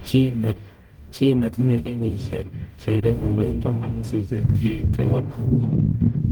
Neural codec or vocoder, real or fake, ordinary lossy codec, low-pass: codec, 44.1 kHz, 0.9 kbps, DAC; fake; Opus, 32 kbps; 19.8 kHz